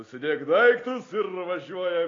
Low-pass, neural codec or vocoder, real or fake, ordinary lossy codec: 7.2 kHz; none; real; AAC, 32 kbps